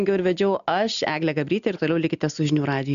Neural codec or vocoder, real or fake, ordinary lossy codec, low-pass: none; real; MP3, 96 kbps; 7.2 kHz